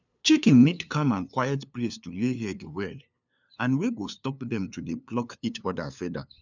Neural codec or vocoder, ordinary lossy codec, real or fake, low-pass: codec, 16 kHz, 2 kbps, FunCodec, trained on LibriTTS, 25 frames a second; none; fake; 7.2 kHz